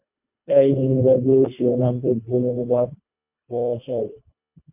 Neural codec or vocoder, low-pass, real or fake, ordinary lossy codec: codec, 24 kHz, 1.5 kbps, HILCodec; 3.6 kHz; fake; MP3, 32 kbps